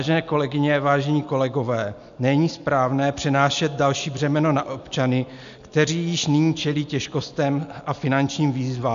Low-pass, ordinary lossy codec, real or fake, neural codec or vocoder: 7.2 kHz; MP3, 64 kbps; real; none